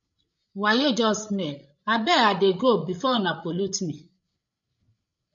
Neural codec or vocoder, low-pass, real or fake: codec, 16 kHz, 16 kbps, FreqCodec, larger model; 7.2 kHz; fake